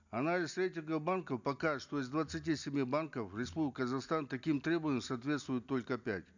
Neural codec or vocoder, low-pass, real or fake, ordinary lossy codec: none; 7.2 kHz; real; none